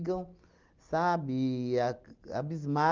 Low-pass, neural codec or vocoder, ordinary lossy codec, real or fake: 7.2 kHz; none; Opus, 32 kbps; real